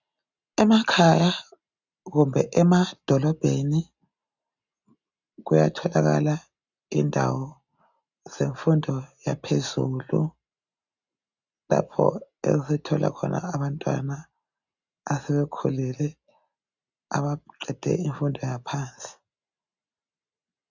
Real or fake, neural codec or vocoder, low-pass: real; none; 7.2 kHz